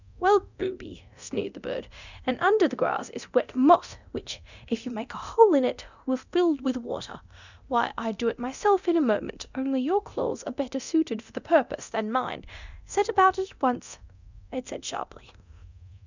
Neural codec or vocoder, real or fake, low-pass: codec, 24 kHz, 0.9 kbps, DualCodec; fake; 7.2 kHz